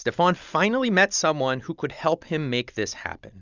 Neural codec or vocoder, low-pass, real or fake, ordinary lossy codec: none; 7.2 kHz; real; Opus, 64 kbps